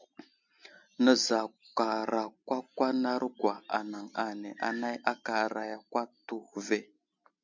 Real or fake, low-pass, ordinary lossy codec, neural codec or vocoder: real; 7.2 kHz; MP3, 64 kbps; none